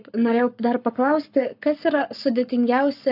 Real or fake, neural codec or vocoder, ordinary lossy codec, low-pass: fake; codec, 44.1 kHz, 7.8 kbps, Pupu-Codec; AAC, 48 kbps; 5.4 kHz